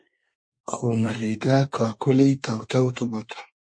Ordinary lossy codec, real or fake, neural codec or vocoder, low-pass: MP3, 48 kbps; fake; codec, 24 kHz, 1 kbps, SNAC; 9.9 kHz